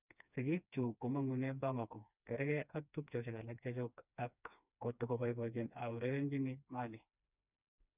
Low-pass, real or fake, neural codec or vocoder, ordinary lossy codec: 3.6 kHz; fake; codec, 16 kHz, 2 kbps, FreqCodec, smaller model; none